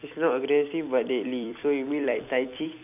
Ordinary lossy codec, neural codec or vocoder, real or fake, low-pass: none; vocoder, 44.1 kHz, 128 mel bands every 256 samples, BigVGAN v2; fake; 3.6 kHz